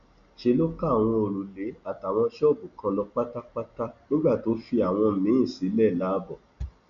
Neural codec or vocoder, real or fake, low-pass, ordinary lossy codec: none; real; 7.2 kHz; none